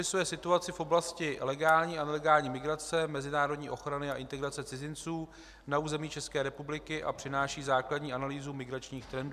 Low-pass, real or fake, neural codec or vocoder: 14.4 kHz; real; none